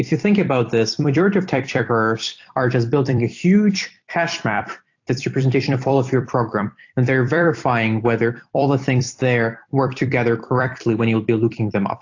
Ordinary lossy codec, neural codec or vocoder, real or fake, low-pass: AAC, 48 kbps; vocoder, 44.1 kHz, 128 mel bands every 256 samples, BigVGAN v2; fake; 7.2 kHz